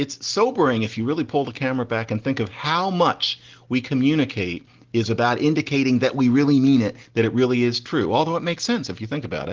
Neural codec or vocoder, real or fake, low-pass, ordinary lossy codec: none; real; 7.2 kHz; Opus, 16 kbps